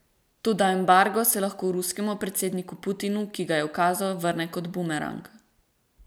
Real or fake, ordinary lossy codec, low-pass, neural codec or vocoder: real; none; none; none